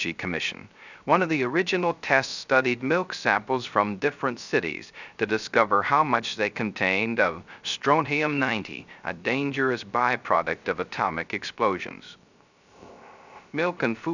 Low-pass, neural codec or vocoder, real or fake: 7.2 kHz; codec, 16 kHz, 0.3 kbps, FocalCodec; fake